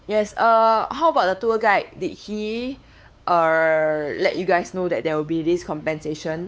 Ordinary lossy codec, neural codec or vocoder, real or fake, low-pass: none; codec, 16 kHz, 4 kbps, X-Codec, WavLM features, trained on Multilingual LibriSpeech; fake; none